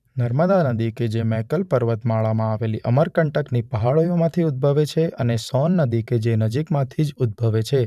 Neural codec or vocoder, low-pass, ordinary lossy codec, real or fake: vocoder, 44.1 kHz, 128 mel bands every 512 samples, BigVGAN v2; 14.4 kHz; none; fake